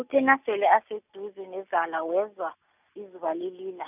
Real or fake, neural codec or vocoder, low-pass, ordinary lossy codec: real; none; 3.6 kHz; none